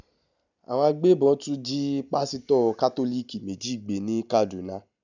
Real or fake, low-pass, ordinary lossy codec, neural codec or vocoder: real; 7.2 kHz; none; none